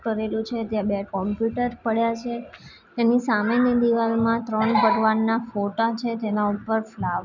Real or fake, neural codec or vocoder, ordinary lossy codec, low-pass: real; none; none; 7.2 kHz